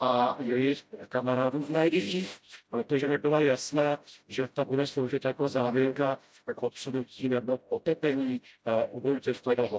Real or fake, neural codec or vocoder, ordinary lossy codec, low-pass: fake; codec, 16 kHz, 0.5 kbps, FreqCodec, smaller model; none; none